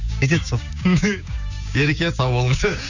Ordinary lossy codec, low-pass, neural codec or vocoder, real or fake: none; 7.2 kHz; codec, 16 kHz, 6 kbps, DAC; fake